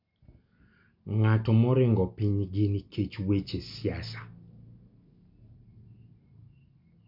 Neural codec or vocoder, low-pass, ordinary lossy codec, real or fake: none; 5.4 kHz; MP3, 48 kbps; real